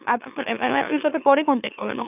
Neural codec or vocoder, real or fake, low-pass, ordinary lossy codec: autoencoder, 44.1 kHz, a latent of 192 numbers a frame, MeloTTS; fake; 3.6 kHz; none